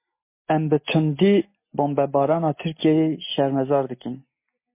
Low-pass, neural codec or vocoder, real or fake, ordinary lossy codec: 3.6 kHz; none; real; MP3, 24 kbps